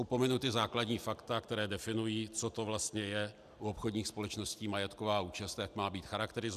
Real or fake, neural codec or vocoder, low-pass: real; none; 14.4 kHz